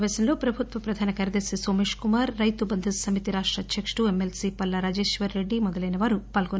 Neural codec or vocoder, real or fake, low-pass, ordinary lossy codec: none; real; none; none